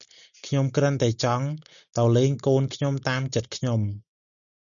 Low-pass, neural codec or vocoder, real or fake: 7.2 kHz; none; real